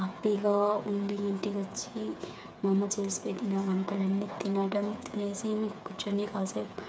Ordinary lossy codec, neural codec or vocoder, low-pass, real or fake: none; codec, 16 kHz, 8 kbps, FreqCodec, smaller model; none; fake